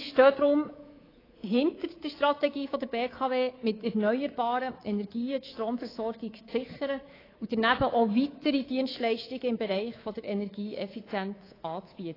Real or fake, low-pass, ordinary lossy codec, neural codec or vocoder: fake; 5.4 kHz; AAC, 24 kbps; codec, 24 kHz, 3.1 kbps, DualCodec